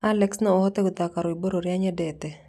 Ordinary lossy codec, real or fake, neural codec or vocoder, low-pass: none; real; none; 14.4 kHz